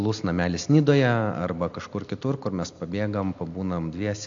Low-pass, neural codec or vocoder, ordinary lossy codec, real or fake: 7.2 kHz; none; AAC, 64 kbps; real